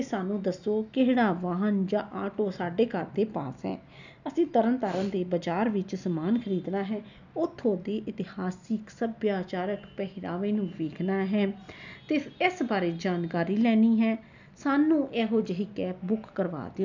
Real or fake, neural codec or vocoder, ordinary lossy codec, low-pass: real; none; none; 7.2 kHz